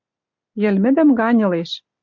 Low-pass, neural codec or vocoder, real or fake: 7.2 kHz; none; real